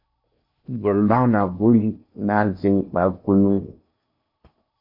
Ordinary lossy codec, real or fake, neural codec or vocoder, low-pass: MP3, 32 kbps; fake; codec, 16 kHz in and 24 kHz out, 0.8 kbps, FocalCodec, streaming, 65536 codes; 5.4 kHz